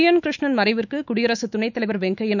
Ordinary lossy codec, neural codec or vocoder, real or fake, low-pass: none; autoencoder, 48 kHz, 128 numbers a frame, DAC-VAE, trained on Japanese speech; fake; 7.2 kHz